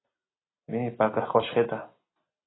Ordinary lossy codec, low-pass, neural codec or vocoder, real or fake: AAC, 16 kbps; 7.2 kHz; none; real